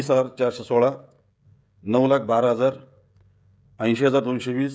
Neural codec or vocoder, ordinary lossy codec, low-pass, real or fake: codec, 16 kHz, 16 kbps, FreqCodec, smaller model; none; none; fake